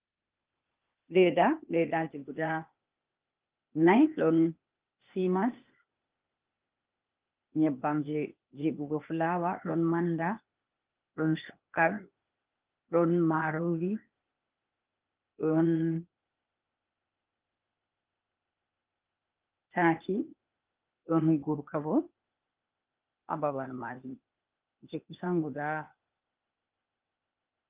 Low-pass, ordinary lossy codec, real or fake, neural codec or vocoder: 3.6 kHz; Opus, 32 kbps; fake; codec, 16 kHz, 0.8 kbps, ZipCodec